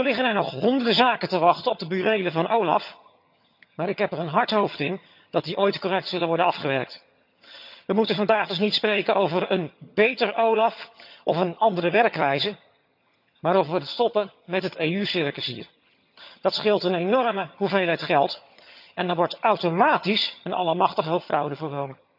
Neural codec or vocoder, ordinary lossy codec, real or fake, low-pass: vocoder, 22.05 kHz, 80 mel bands, HiFi-GAN; none; fake; 5.4 kHz